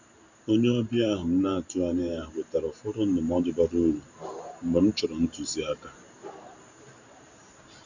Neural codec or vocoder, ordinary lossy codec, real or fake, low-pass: vocoder, 44.1 kHz, 128 mel bands every 512 samples, BigVGAN v2; none; fake; 7.2 kHz